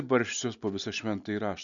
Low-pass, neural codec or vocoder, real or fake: 7.2 kHz; none; real